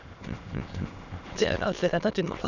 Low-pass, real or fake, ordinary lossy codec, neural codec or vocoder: 7.2 kHz; fake; none; autoencoder, 22.05 kHz, a latent of 192 numbers a frame, VITS, trained on many speakers